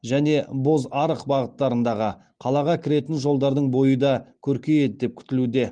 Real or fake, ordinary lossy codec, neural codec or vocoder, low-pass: real; Opus, 24 kbps; none; 9.9 kHz